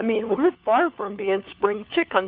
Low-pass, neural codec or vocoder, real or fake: 5.4 kHz; codec, 16 kHz, 4 kbps, FunCodec, trained on LibriTTS, 50 frames a second; fake